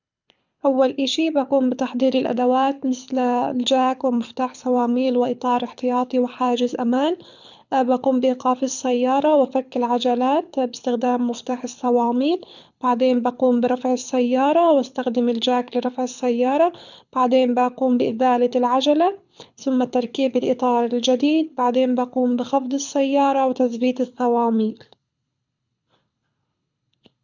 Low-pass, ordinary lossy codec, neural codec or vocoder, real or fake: 7.2 kHz; none; codec, 24 kHz, 6 kbps, HILCodec; fake